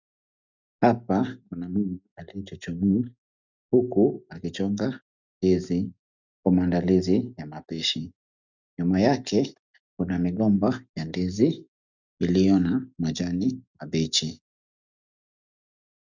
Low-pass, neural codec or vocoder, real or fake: 7.2 kHz; none; real